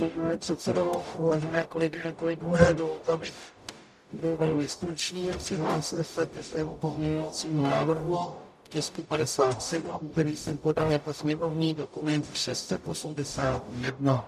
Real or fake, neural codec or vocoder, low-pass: fake; codec, 44.1 kHz, 0.9 kbps, DAC; 14.4 kHz